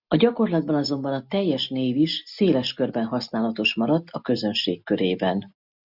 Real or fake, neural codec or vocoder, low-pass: real; none; 5.4 kHz